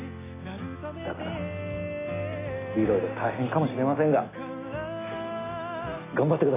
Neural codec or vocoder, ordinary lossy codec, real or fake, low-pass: none; AAC, 16 kbps; real; 3.6 kHz